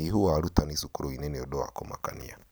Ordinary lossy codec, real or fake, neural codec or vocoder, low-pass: none; real; none; none